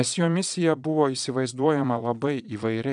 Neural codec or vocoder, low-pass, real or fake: vocoder, 22.05 kHz, 80 mel bands, Vocos; 9.9 kHz; fake